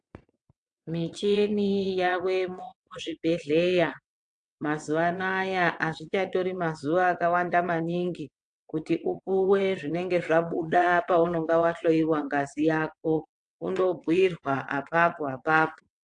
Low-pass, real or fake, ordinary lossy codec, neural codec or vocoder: 9.9 kHz; fake; AAC, 64 kbps; vocoder, 22.05 kHz, 80 mel bands, WaveNeXt